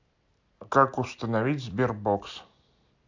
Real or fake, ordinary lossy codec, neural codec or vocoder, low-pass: real; AAC, 48 kbps; none; 7.2 kHz